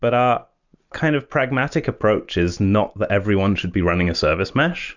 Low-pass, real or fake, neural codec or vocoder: 7.2 kHz; real; none